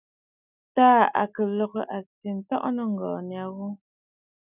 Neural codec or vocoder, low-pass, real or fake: none; 3.6 kHz; real